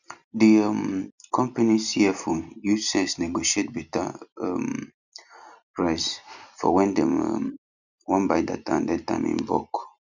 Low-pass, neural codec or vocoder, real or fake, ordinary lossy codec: 7.2 kHz; none; real; none